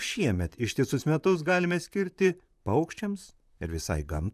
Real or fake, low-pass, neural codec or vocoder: fake; 14.4 kHz; vocoder, 44.1 kHz, 128 mel bands, Pupu-Vocoder